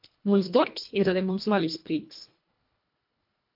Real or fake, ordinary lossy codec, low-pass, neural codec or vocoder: fake; MP3, 48 kbps; 5.4 kHz; codec, 24 kHz, 1.5 kbps, HILCodec